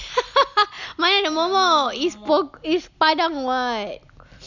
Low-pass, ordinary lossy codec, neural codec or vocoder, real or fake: 7.2 kHz; none; none; real